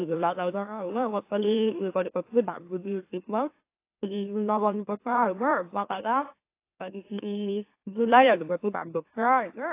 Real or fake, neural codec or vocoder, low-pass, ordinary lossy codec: fake; autoencoder, 44.1 kHz, a latent of 192 numbers a frame, MeloTTS; 3.6 kHz; AAC, 24 kbps